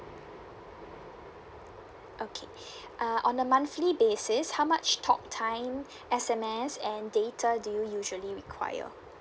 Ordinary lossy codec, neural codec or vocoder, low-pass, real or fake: none; none; none; real